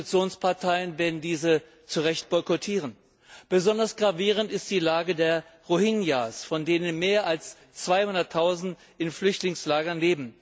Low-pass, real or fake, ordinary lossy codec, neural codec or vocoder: none; real; none; none